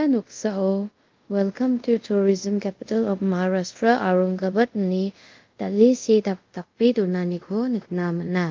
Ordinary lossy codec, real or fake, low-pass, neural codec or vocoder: Opus, 32 kbps; fake; 7.2 kHz; codec, 24 kHz, 0.5 kbps, DualCodec